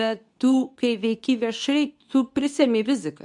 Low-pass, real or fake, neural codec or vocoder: 10.8 kHz; fake; codec, 24 kHz, 0.9 kbps, WavTokenizer, medium speech release version 2